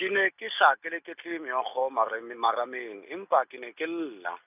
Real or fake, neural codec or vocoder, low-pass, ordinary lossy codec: real; none; 3.6 kHz; none